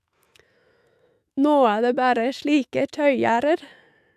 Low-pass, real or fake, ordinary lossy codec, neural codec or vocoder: 14.4 kHz; fake; AAC, 96 kbps; autoencoder, 48 kHz, 128 numbers a frame, DAC-VAE, trained on Japanese speech